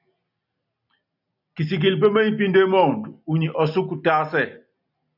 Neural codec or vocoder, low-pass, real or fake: none; 5.4 kHz; real